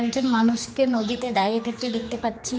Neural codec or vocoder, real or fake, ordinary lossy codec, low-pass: codec, 16 kHz, 2 kbps, X-Codec, HuBERT features, trained on general audio; fake; none; none